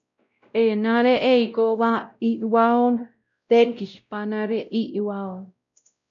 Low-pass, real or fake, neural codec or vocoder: 7.2 kHz; fake; codec, 16 kHz, 0.5 kbps, X-Codec, WavLM features, trained on Multilingual LibriSpeech